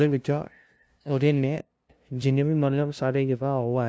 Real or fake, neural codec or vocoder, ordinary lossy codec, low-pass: fake; codec, 16 kHz, 0.5 kbps, FunCodec, trained on LibriTTS, 25 frames a second; none; none